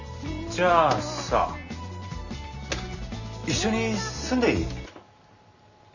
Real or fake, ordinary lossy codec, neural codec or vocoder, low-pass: real; none; none; 7.2 kHz